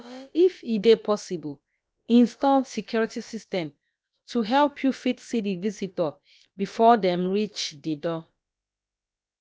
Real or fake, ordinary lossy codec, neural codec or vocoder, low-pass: fake; none; codec, 16 kHz, about 1 kbps, DyCAST, with the encoder's durations; none